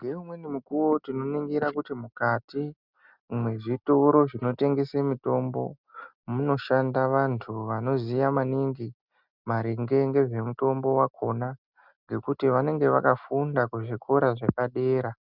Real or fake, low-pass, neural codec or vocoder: real; 5.4 kHz; none